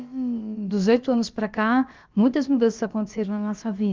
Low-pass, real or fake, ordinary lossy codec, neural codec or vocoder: 7.2 kHz; fake; Opus, 32 kbps; codec, 16 kHz, about 1 kbps, DyCAST, with the encoder's durations